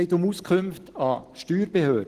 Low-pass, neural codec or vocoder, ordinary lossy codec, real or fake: 14.4 kHz; none; Opus, 16 kbps; real